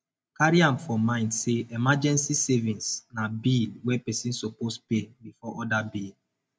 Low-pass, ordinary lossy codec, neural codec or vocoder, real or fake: none; none; none; real